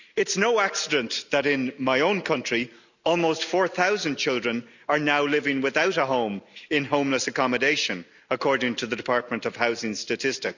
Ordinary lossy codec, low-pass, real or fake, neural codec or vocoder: none; 7.2 kHz; real; none